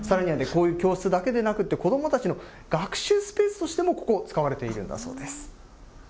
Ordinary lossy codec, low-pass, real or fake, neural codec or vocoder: none; none; real; none